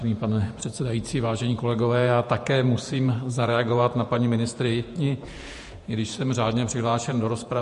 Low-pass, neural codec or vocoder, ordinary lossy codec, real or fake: 14.4 kHz; vocoder, 48 kHz, 128 mel bands, Vocos; MP3, 48 kbps; fake